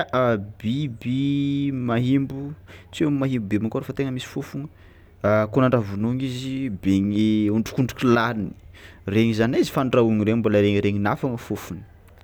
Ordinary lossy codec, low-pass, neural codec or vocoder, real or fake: none; none; none; real